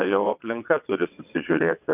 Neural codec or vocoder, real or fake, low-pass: vocoder, 22.05 kHz, 80 mel bands, WaveNeXt; fake; 3.6 kHz